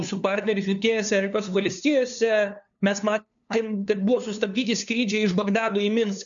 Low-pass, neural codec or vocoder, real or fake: 7.2 kHz; codec, 16 kHz, 2 kbps, FunCodec, trained on LibriTTS, 25 frames a second; fake